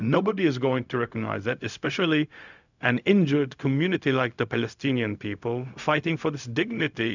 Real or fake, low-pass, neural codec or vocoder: fake; 7.2 kHz; codec, 16 kHz, 0.4 kbps, LongCat-Audio-Codec